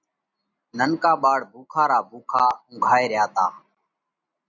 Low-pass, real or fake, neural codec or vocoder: 7.2 kHz; real; none